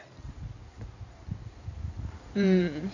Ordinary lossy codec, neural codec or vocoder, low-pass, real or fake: none; codec, 16 kHz in and 24 kHz out, 2.2 kbps, FireRedTTS-2 codec; 7.2 kHz; fake